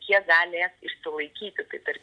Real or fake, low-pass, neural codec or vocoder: real; 9.9 kHz; none